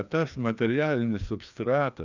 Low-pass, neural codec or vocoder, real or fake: 7.2 kHz; codec, 16 kHz, 2 kbps, FreqCodec, larger model; fake